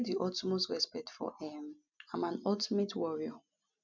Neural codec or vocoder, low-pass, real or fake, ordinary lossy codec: none; 7.2 kHz; real; none